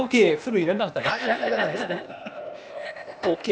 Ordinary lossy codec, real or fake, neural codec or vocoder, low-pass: none; fake; codec, 16 kHz, 0.8 kbps, ZipCodec; none